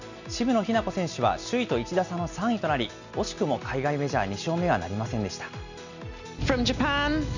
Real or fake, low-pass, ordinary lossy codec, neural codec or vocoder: real; 7.2 kHz; none; none